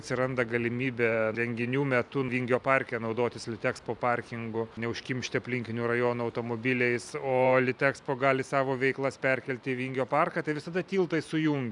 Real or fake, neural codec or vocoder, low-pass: fake; vocoder, 44.1 kHz, 128 mel bands every 512 samples, BigVGAN v2; 10.8 kHz